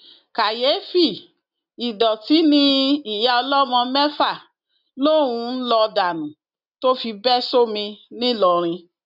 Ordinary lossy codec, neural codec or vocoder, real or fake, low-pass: none; none; real; 5.4 kHz